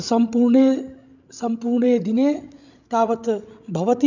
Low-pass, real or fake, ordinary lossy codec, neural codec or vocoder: 7.2 kHz; fake; none; codec, 16 kHz, 16 kbps, FreqCodec, larger model